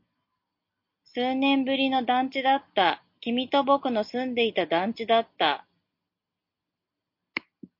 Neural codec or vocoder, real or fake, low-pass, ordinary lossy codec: none; real; 5.4 kHz; MP3, 32 kbps